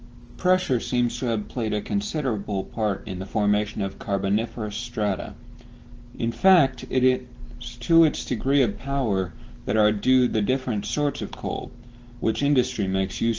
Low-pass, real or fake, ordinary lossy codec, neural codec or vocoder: 7.2 kHz; real; Opus, 16 kbps; none